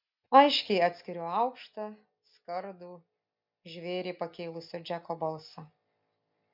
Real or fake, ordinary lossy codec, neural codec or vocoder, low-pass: real; MP3, 48 kbps; none; 5.4 kHz